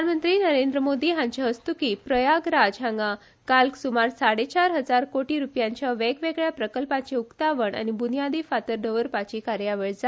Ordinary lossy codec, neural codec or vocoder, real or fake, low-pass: none; none; real; none